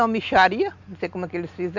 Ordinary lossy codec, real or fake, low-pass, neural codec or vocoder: none; real; 7.2 kHz; none